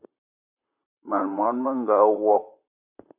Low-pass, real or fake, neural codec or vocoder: 3.6 kHz; fake; vocoder, 44.1 kHz, 128 mel bands, Pupu-Vocoder